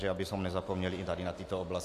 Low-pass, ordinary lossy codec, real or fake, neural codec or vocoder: 14.4 kHz; AAC, 64 kbps; real; none